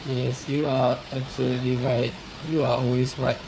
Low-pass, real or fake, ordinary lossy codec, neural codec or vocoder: none; fake; none; codec, 16 kHz, 4 kbps, FunCodec, trained on Chinese and English, 50 frames a second